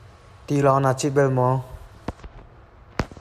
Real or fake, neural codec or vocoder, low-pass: real; none; 14.4 kHz